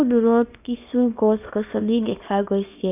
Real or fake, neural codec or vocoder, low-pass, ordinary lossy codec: fake; codec, 24 kHz, 0.9 kbps, WavTokenizer, small release; 3.6 kHz; none